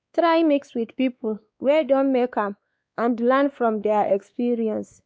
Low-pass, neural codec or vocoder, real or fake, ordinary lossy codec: none; codec, 16 kHz, 2 kbps, X-Codec, WavLM features, trained on Multilingual LibriSpeech; fake; none